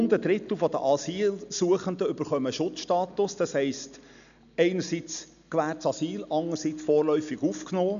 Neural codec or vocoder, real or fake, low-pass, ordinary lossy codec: none; real; 7.2 kHz; none